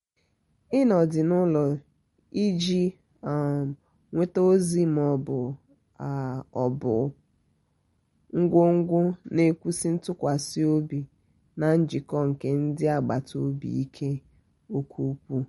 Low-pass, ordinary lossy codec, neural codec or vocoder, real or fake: 19.8 kHz; MP3, 48 kbps; none; real